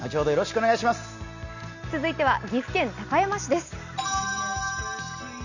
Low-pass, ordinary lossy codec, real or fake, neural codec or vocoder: 7.2 kHz; none; real; none